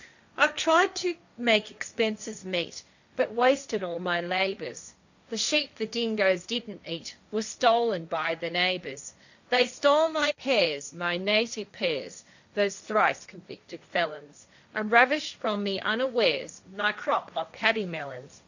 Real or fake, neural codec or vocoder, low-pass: fake; codec, 16 kHz, 1.1 kbps, Voila-Tokenizer; 7.2 kHz